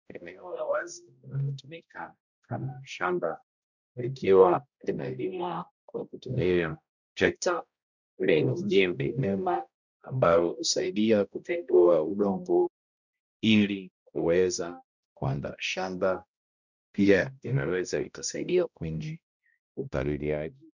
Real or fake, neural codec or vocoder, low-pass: fake; codec, 16 kHz, 0.5 kbps, X-Codec, HuBERT features, trained on balanced general audio; 7.2 kHz